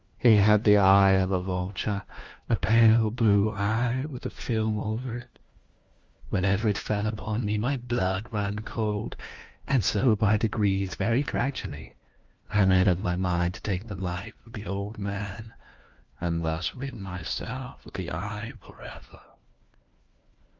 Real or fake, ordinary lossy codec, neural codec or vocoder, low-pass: fake; Opus, 32 kbps; codec, 16 kHz, 1 kbps, FunCodec, trained on LibriTTS, 50 frames a second; 7.2 kHz